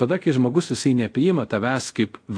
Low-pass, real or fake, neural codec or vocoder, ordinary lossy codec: 9.9 kHz; fake; codec, 24 kHz, 0.5 kbps, DualCodec; AAC, 48 kbps